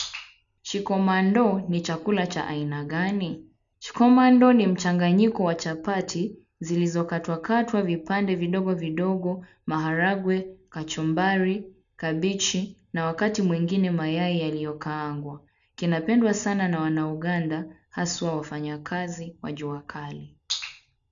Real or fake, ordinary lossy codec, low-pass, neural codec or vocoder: real; none; 7.2 kHz; none